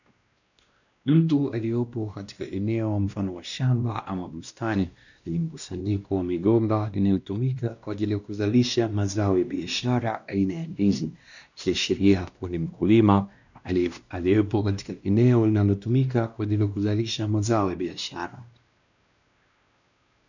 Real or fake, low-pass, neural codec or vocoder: fake; 7.2 kHz; codec, 16 kHz, 1 kbps, X-Codec, WavLM features, trained on Multilingual LibriSpeech